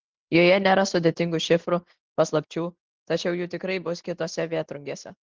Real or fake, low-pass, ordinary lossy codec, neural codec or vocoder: real; 7.2 kHz; Opus, 16 kbps; none